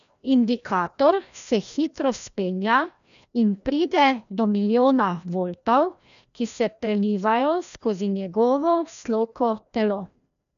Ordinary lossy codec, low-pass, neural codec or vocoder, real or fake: none; 7.2 kHz; codec, 16 kHz, 1 kbps, FreqCodec, larger model; fake